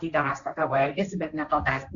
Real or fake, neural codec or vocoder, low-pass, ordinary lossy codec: fake; codec, 16 kHz, 1.1 kbps, Voila-Tokenizer; 7.2 kHz; MP3, 96 kbps